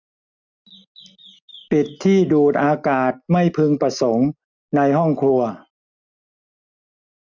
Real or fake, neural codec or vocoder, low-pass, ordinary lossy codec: real; none; 7.2 kHz; MP3, 64 kbps